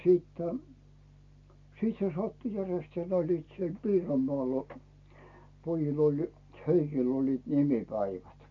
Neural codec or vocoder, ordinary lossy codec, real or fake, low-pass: none; MP3, 48 kbps; real; 7.2 kHz